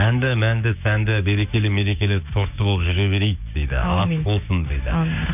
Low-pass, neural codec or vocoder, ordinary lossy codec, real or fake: 3.6 kHz; codec, 16 kHz, 6 kbps, DAC; none; fake